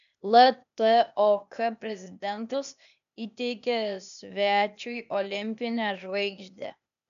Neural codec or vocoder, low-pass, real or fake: codec, 16 kHz, 0.8 kbps, ZipCodec; 7.2 kHz; fake